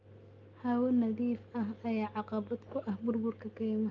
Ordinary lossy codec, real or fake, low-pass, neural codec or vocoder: Opus, 24 kbps; real; 7.2 kHz; none